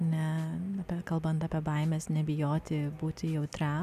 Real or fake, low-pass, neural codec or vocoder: real; 14.4 kHz; none